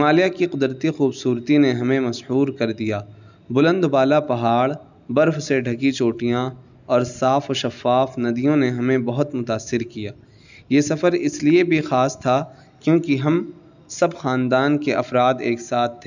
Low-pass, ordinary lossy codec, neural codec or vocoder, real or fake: 7.2 kHz; none; none; real